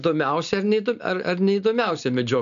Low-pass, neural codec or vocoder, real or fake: 7.2 kHz; none; real